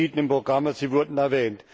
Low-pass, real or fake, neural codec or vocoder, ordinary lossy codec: none; real; none; none